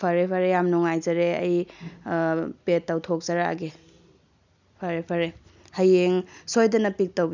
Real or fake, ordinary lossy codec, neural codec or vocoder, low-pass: real; none; none; 7.2 kHz